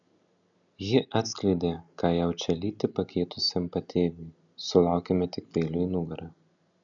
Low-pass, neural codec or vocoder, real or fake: 7.2 kHz; none; real